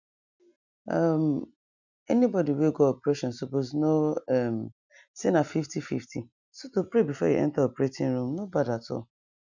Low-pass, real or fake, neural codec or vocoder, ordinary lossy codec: 7.2 kHz; real; none; none